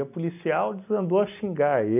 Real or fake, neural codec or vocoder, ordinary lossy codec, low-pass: real; none; AAC, 32 kbps; 3.6 kHz